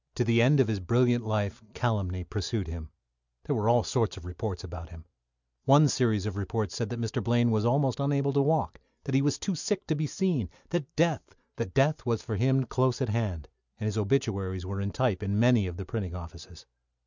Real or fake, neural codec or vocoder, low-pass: real; none; 7.2 kHz